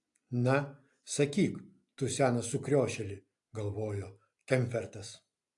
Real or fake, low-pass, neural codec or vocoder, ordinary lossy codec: real; 10.8 kHz; none; AAC, 64 kbps